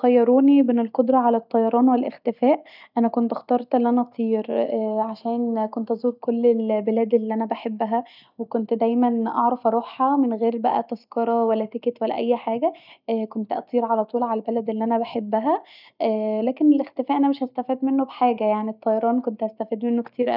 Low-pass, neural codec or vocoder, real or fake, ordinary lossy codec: 5.4 kHz; none; real; none